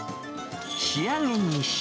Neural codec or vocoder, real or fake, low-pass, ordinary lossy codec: none; real; none; none